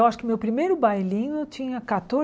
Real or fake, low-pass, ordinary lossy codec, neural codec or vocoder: real; none; none; none